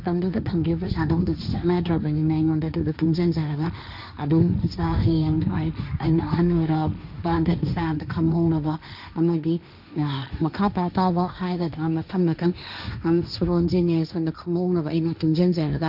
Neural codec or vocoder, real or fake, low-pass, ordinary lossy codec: codec, 16 kHz, 1.1 kbps, Voila-Tokenizer; fake; 5.4 kHz; none